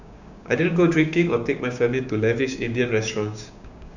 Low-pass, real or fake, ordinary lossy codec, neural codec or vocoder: 7.2 kHz; fake; none; codec, 16 kHz, 6 kbps, DAC